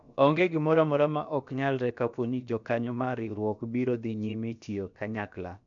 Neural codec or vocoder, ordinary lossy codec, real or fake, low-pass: codec, 16 kHz, about 1 kbps, DyCAST, with the encoder's durations; none; fake; 7.2 kHz